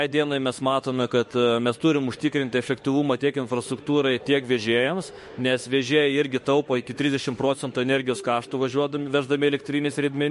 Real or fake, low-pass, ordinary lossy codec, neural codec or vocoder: fake; 14.4 kHz; MP3, 48 kbps; autoencoder, 48 kHz, 32 numbers a frame, DAC-VAE, trained on Japanese speech